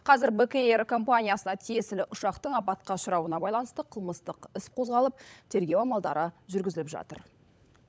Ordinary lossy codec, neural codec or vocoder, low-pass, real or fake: none; codec, 16 kHz, 16 kbps, FunCodec, trained on LibriTTS, 50 frames a second; none; fake